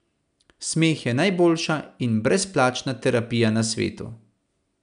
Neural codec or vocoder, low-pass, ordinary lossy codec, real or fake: none; 9.9 kHz; none; real